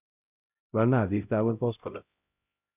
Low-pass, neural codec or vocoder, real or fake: 3.6 kHz; codec, 16 kHz, 0.5 kbps, X-Codec, HuBERT features, trained on LibriSpeech; fake